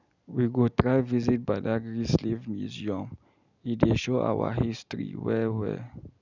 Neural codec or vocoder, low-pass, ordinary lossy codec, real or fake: none; 7.2 kHz; none; real